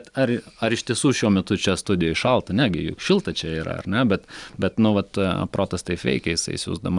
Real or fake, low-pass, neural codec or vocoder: real; 10.8 kHz; none